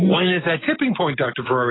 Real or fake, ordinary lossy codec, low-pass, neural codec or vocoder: real; AAC, 16 kbps; 7.2 kHz; none